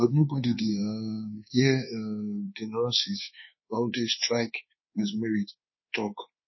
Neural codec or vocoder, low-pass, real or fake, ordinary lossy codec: codec, 24 kHz, 1.2 kbps, DualCodec; 7.2 kHz; fake; MP3, 24 kbps